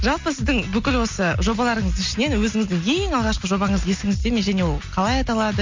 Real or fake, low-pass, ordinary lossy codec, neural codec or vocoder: real; 7.2 kHz; MP3, 48 kbps; none